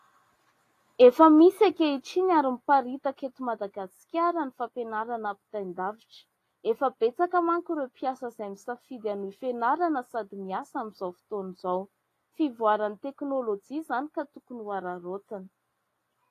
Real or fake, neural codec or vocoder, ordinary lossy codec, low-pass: real; none; AAC, 48 kbps; 14.4 kHz